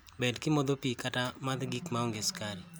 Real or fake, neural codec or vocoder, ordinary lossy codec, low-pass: real; none; none; none